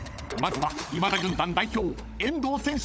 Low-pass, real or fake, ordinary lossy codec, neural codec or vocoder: none; fake; none; codec, 16 kHz, 16 kbps, FunCodec, trained on LibriTTS, 50 frames a second